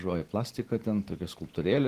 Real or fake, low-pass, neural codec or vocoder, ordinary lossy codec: real; 14.4 kHz; none; Opus, 16 kbps